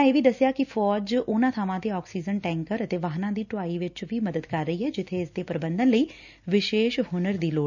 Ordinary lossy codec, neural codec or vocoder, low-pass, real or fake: none; none; 7.2 kHz; real